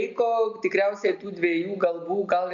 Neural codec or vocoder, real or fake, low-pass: none; real; 7.2 kHz